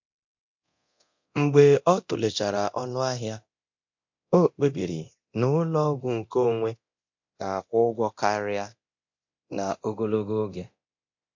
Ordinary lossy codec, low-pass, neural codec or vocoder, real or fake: MP3, 48 kbps; 7.2 kHz; codec, 24 kHz, 0.9 kbps, DualCodec; fake